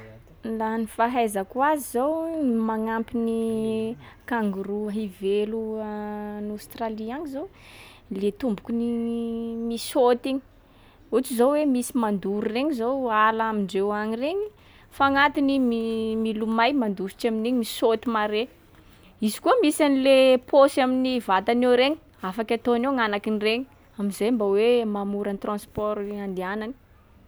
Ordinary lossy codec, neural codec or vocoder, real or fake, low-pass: none; none; real; none